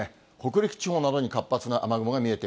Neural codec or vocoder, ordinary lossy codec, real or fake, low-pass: none; none; real; none